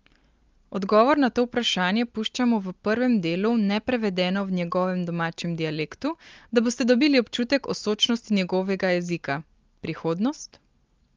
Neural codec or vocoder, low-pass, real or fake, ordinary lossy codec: none; 7.2 kHz; real; Opus, 32 kbps